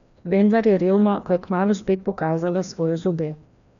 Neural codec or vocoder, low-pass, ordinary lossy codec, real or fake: codec, 16 kHz, 1 kbps, FreqCodec, larger model; 7.2 kHz; none; fake